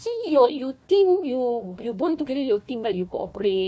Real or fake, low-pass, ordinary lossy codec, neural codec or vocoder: fake; none; none; codec, 16 kHz, 1 kbps, FunCodec, trained on Chinese and English, 50 frames a second